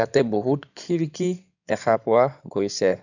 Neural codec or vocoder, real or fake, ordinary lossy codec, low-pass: codec, 16 kHz in and 24 kHz out, 2.2 kbps, FireRedTTS-2 codec; fake; none; 7.2 kHz